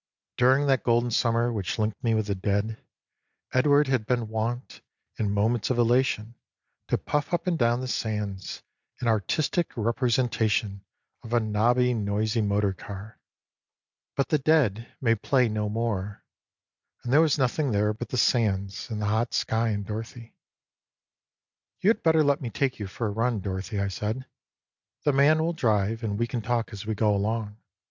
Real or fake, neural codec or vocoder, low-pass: real; none; 7.2 kHz